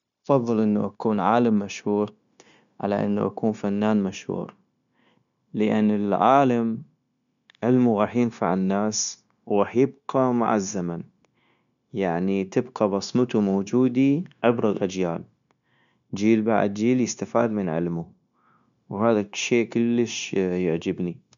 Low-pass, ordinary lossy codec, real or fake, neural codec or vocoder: 7.2 kHz; none; fake; codec, 16 kHz, 0.9 kbps, LongCat-Audio-Codec